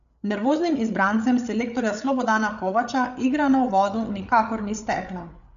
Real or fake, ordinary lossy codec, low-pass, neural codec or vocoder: fake; none; 7.2 kHz; codec, 16 kHz, 8 kbps, FreqCodec, larger model